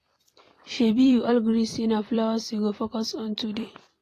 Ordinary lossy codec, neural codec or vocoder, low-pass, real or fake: AAC, 48 kbps; vocoder, 44.1 kHz, 128 mel bands every 256 samples, BigVGAN v2; 14.4 kHz; fake